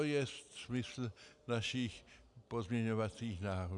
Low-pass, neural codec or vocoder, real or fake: 10.8 kHz; none; real